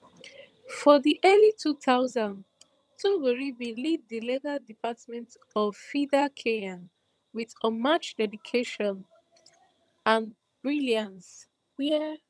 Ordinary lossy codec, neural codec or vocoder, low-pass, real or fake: none; vocoder, 22.05 kHz, 80 mel bands, HiFi-GAN; none; fake